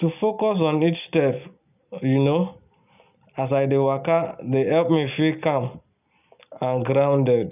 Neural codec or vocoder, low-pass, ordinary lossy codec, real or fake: none; 3.6 kHz; none; real